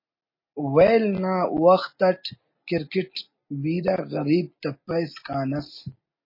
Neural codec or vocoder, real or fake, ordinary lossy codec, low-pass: none; real; MP3, 24 kbps; 5.4 kHz